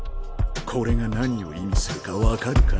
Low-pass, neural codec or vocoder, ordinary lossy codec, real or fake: none; none; none; real